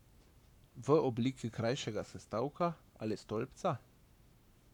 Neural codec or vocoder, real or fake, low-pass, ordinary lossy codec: codec, 44.1 kHz, 7.8 kbps, Pupu-Codec; fake; 19.8 kHz; none